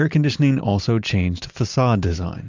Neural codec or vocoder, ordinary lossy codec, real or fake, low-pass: none; MP3, 64 kbps; real; 7.2 kHz